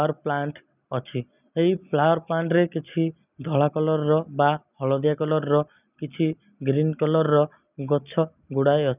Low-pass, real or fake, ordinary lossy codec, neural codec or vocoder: 3.6 kHz; real; none; none